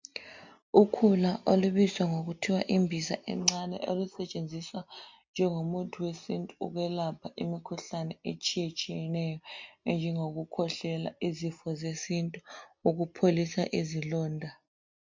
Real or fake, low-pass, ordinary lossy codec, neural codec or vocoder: real; 7.2 kHz; MP3, 48 kbps; none